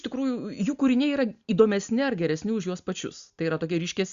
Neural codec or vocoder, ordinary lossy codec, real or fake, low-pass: none; Opus, 64 kbps; real; 7.2 kHz